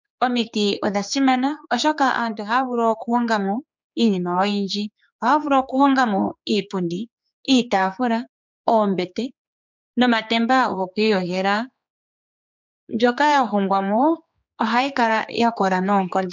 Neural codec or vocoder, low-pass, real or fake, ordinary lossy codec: codec, 16 kHz, 4 kbps, X-Codec, HuBERT features, trained on general audio; 7.2 kHz; fake; MP3, 64 kbps